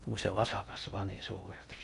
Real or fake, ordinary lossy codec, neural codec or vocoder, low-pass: fake; none; codec, 16 kHz in and 24 kHz out, 0.6 kbps, FocalCodec, streaming, 4096 codes; 10.8 kHz